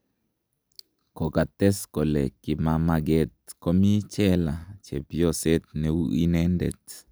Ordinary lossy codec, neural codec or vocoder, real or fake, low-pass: none; none; real; none